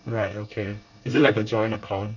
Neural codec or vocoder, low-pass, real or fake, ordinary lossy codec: codec, 24 kHz, 1 kbps, SNAC; 7.2 kHz; fake; none